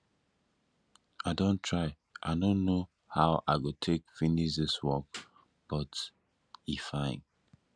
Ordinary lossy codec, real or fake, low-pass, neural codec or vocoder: none; real; 9.9 kHz; none